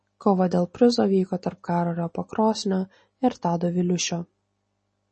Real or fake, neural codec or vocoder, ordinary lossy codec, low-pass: real; none; MP3, 32 kbps; 10.8 kHz